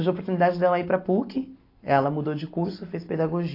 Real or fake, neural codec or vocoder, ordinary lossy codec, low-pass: real; none; AAC, 32 kbps; 5.4 kHz